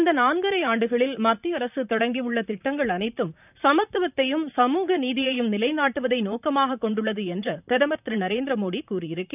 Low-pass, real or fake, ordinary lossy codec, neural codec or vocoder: 3.6 kHz; fake; none; vocoder, 44.1 kHz, 128 mel bands, Pupu-Vocoder